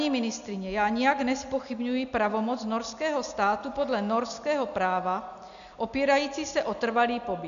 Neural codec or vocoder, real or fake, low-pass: none; real; 7.2 kHz